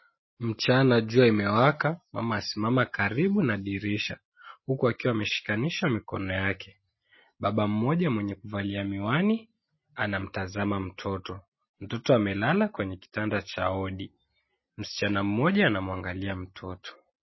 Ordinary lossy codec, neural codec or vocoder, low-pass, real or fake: MP3, 24 kbps; none; 7.2 kHz; real